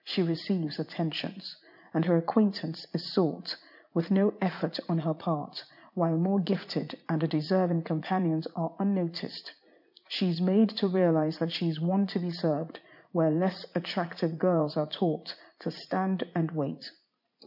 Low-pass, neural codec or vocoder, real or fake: 5.4 kHz; vocoder, 44.1 kHz, 80 mel bands, Vocos; fake